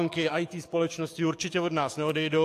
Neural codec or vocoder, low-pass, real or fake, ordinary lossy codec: codec, 44.1 kHz, 7.8 kbps, DAC; 14.4 kHz; fake; AAC, 64 kbps